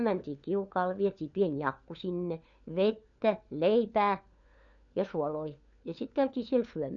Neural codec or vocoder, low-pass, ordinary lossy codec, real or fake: codec, 16 kHz, 4 kbps, FunCodec, trained on LibriTTS, 50 frames a second; 7.2 kHz; MP3, 96 kbps; fake